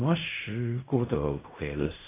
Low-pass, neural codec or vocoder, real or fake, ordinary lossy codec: 3.6 kHz; codec, 16 kHz in and 24 kHz out, 0.4 kbps, LongCat-Audio-Codec, fine tuned four codebook decoder; fake; AAC, 24 kbps